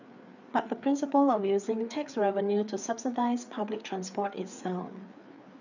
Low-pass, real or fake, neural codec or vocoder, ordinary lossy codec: 7.2 kHz; fake; codec, 16 kHz, 4 kbps, FreqCodec, larger model; none